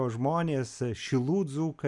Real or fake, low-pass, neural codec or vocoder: real; 10.8 kHz; none